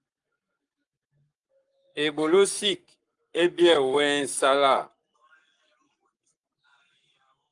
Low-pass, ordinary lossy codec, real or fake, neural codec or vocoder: 10.8 kHz; Opus, 32 kbps; fake; codec, 44.1 kHz, 7.8 kbps, Pupu-Codec